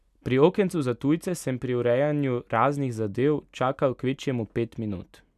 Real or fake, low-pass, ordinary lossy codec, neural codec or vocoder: real; 14.4 kHz; none; none